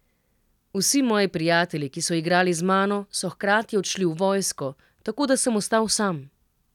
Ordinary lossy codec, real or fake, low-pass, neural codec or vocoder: none; real; 19.8 kHz; none